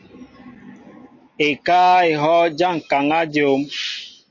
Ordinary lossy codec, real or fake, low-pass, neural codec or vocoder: MP3, 32 kbps; real; 7.2 kHz; none